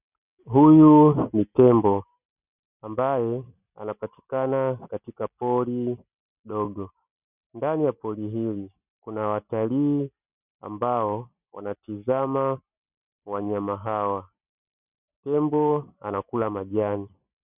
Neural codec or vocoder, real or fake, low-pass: none; real; 3.6 kHz